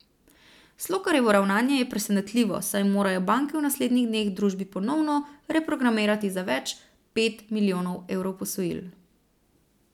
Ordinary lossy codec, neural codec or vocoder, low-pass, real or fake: none; none; 19.8 kHz; real